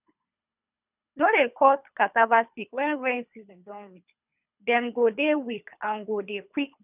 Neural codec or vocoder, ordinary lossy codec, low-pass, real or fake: codec, 24 kHz, 3 kbps, HILCodec; none; 3.6 kHz; fake